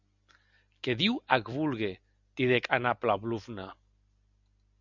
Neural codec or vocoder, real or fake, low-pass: none; real; 7.2 kHz